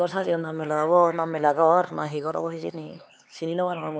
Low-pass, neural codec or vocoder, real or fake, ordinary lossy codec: none; codec, 16 kHz, 4 kbps, X-Codec, HuBERT features, trained on LibriSpeech; fake; none